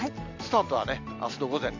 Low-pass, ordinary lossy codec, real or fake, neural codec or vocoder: 7.2 kHz; MP3, 64 kbps; real; none